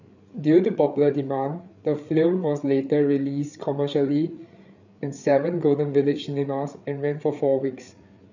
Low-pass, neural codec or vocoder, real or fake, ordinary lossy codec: 7.2 kHz; codec, 16 kHz, 8 kbps, FreqCodec, larger model; fake; none